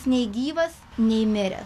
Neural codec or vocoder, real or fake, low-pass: none; real; 14.4 kHz